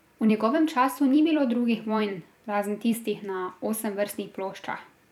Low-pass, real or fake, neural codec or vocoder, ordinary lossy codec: 19.8 kHz; fake; vocoder, 44.1 kHz, 128 mel bands every 256 samples, BigVGAN v2; none